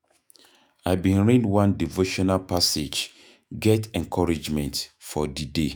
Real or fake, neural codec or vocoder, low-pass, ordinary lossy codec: fake; autoencoder, 48 kHz, 128 numbers a frame, DAC-VAE, trained on Japanese speech; none; none